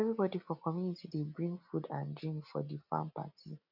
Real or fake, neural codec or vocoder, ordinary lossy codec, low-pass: real; none; none; 5.4 kHz